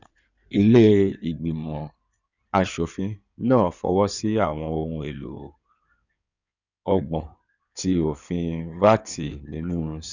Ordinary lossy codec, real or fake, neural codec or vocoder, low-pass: none; fake; codec, 16 kHz in and 24 kHz out, 2.2 kbps, FireRedTTS-2 codec; 7.2 kHz